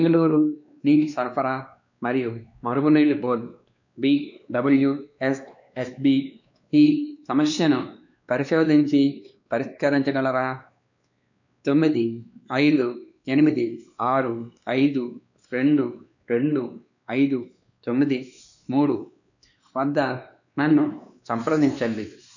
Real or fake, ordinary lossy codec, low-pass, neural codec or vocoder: fake; none; 7.2 kHz; codec, 16 kHz, 2 kbps, X-Codec, WavLM features, trained on Multilingual LibriSpeech